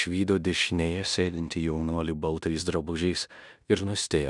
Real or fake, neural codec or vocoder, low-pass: fake; codec, 16 kHz in and 24 kHz out, 0.9 kbps, LongCat-Audio-Codec, fine tuned four codebook decoder; 10.8 kHz